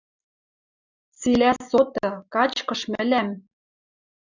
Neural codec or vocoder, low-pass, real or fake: none; 7.2 kHz; real